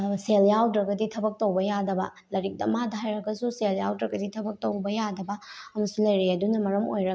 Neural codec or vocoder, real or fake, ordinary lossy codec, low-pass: none; real; none; none